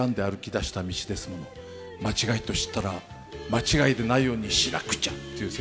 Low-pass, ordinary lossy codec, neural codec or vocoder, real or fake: none; none; none; real